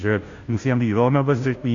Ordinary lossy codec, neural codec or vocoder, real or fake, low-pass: AAC, 48 kbps; codec, 16 kHz, 0.5 kbps, FunCodec, trained on Chinese and English, 25 frames a second; fake; 7.2 kHz